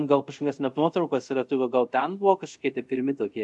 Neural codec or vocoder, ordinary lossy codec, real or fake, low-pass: codec, 24 kHz, 0.5 kbps, DualCodec; MP3, 64 kbps; fake; 10.8 kHz